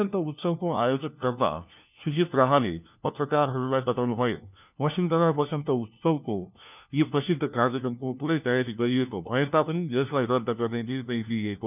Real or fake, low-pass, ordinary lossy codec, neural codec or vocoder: fake; 3.6 kHz; none; codec, 16 kHz, 1 kbps, FunCodec, trained on LibriTTS, 50 frames a second